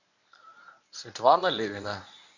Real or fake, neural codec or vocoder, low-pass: fake; codec, 24 kHz, 0.9 kbps, WavTokenizer, medium speech release version 1; 7.2 kHz